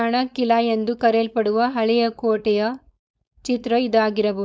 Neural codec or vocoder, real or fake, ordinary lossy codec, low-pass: codec, 16 kHz, 4.8 kbps, FACodec; fake; none; none